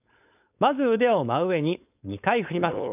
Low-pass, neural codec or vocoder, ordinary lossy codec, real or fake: 3.6 kHz; codec, 16 kHz, 4.8 kbps, FACodec; none; fake